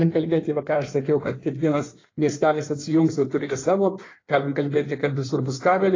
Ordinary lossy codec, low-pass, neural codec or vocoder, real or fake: AAC, 32 kbps; 7.2 kHz; codec, 16 kHz in and 24 kHz out, 1.1 kbps, FireRedTTS-2 codec; fake